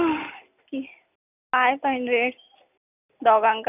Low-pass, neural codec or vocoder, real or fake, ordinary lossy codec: 3.6 kHz; none; real; none